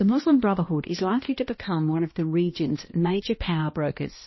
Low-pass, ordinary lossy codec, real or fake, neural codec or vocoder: 7.2 kHz; MP3, 24 kbps; fake; codec, 16 kHz, 1 kbps, X-Codec, HuBERT features, trained on balanced general audio